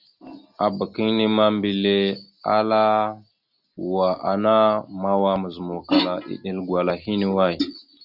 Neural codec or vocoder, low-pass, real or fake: none; 5.4 kHz; real